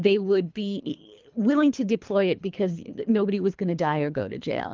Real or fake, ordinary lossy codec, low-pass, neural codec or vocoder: fake; Opus, 32 kbps; 7.2 kHz; codec, 24 kHz, 3 kbps, HILCodec